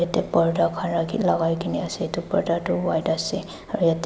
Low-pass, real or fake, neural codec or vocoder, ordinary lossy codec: none; real; none; none